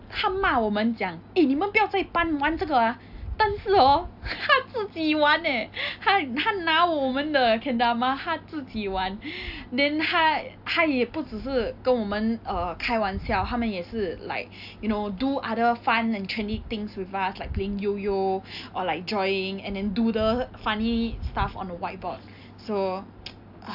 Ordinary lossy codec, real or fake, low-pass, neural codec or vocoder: AAC, 48 kbps; real; 5.4 kHz; none